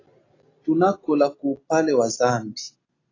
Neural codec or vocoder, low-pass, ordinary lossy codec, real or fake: none; 7.2 kHz; AAC, 32 kbps; real